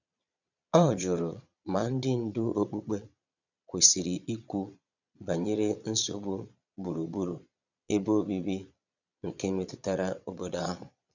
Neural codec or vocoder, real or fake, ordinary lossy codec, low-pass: vocoder, 22.05 kHz, 80 mel bands, Vocos; fake; none; 7.2 kHz